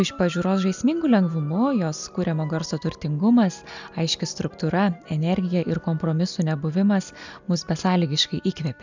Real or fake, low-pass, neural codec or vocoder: real; 7.2 kHz; none